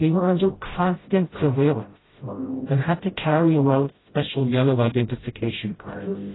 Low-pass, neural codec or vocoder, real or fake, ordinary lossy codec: 7.2 kHz; codec, 16 kHz, 0.5 kbps, FreqCodec, smaller model; fake; AAC, 16 kbps